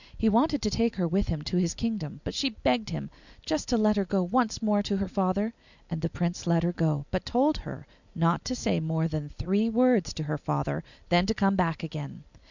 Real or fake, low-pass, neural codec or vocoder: real; 7.2 kHz; none